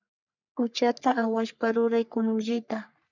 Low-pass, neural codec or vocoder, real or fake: 7.2 kHz; codec, 44.1 kHz, 3.4 kbps, Pupu-Codec; fake